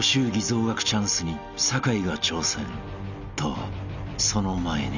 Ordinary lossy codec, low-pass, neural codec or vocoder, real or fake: none; 7.2 kHz; none; real